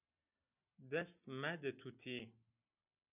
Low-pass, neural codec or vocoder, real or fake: 3.6 kHz; none; real